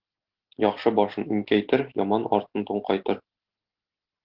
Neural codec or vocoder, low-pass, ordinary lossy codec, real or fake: none; 5.4 kHz; Opus, 16 kbps; real